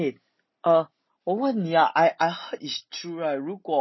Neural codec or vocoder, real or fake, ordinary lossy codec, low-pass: none; real; MP3, 24 kbps; 7.2 kHz